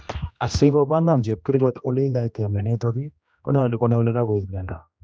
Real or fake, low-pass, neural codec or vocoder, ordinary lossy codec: fake; none; codec, 16 kHz, 1 kbps, X-Codec, HuBERT features, trained on general audio; none